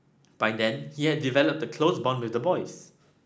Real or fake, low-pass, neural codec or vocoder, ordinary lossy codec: real; none; none; none